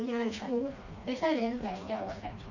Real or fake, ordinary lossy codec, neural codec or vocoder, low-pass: fake; none; codec, 16 kHz, 2 kbps, FreqCodec, smaller model; 7.2 kHz